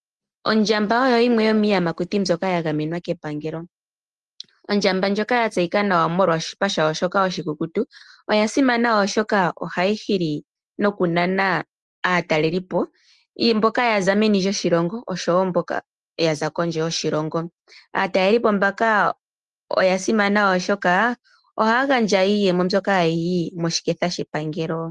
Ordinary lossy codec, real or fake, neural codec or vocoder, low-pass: Opus, 24 kbps; real; none; 10.8 kHz